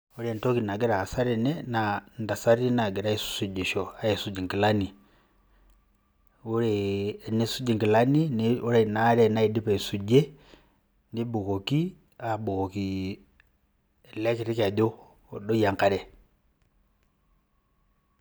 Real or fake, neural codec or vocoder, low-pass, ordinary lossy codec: real; none; none; none